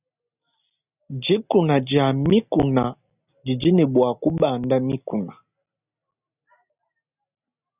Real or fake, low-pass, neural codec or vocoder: real; 3.6 kHz; none